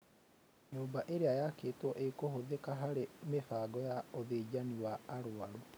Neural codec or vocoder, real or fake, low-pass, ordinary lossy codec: none; real; none; none